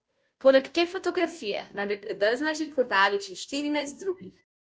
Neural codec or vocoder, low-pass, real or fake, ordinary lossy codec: codec, 16 kHz, 0.5 kbps, FunCodec, trained on Chinese and English, 25 frames a second; none; fake; none